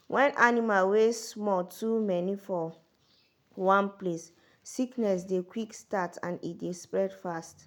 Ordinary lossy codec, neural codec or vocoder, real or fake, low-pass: none; none; real; 19.8 kHz